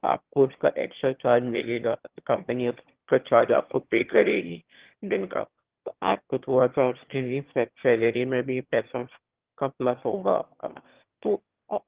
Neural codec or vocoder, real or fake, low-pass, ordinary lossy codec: autoencoder, 22.05 kHz, a latent of 192 numbers a frame, VITS, trained on one speaker; fake; 3.6 kHz; Opus, 16 kbps